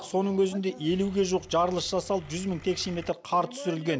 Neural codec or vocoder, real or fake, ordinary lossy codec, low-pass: none; real; none; none